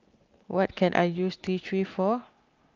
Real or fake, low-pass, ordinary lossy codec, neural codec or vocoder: real; 7.2 kHz; Opus, 24 kbps; none